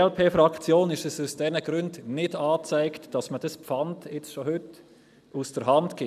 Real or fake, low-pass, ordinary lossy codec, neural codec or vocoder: real; 14.4 kHz; none; none